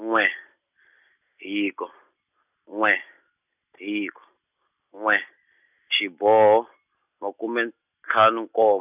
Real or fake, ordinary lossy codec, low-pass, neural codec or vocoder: real; none; 3.6 kHz; none